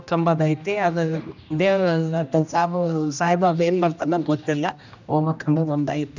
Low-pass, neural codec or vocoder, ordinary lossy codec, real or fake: 7.2 kHz; codec, 16 kHz, 1 kbps, X-Codec, HuBERT features, trained on general audio; none; fake